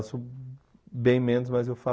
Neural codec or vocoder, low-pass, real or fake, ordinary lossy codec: none; none; real; none